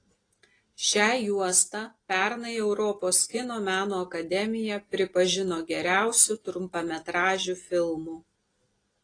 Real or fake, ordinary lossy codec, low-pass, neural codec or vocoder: real; AAC, 32 kbps; 9.9 kHz; none